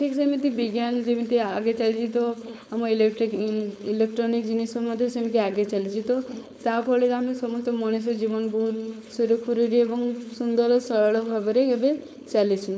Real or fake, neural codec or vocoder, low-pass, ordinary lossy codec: fake; codec, 16 kHz, 4.8 kbps, FACodec; none; none